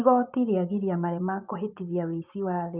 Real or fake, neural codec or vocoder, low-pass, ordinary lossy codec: fake; vocoder, 24 kHz, 100 mel bands, Vocos; 3.6 kHz; Opus, 24 kbps